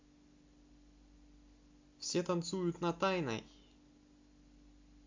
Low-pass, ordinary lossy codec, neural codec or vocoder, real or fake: 7.2 kHz; MP3, 48 kbps; none; real